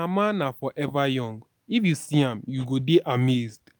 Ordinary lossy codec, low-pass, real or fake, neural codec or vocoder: none; none; real; none